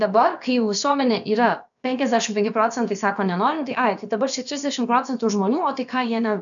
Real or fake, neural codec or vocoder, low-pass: fake; codec, 16 kHz, about 1 kbps, DyCAST, with the encoder's durations; 7.2 kHz